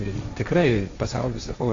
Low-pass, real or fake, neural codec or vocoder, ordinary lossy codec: 7.2 kHz; fake; codec, 16 kHz, 1.1 kbps, Voila-Tokenizer; AAC, 48 kbps